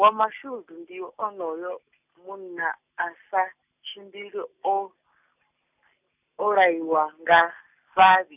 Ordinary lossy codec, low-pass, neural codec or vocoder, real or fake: none; 3.6 kHz; none; real